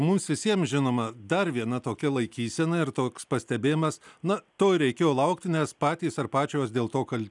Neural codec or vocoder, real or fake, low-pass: none; real; 10.8 kHz